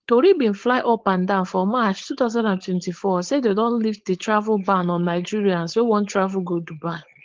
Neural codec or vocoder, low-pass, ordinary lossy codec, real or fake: codec, 16 kHz, 4.8 kbps, FACodec; 7.2 kHz; Opus, 16 kbps; fake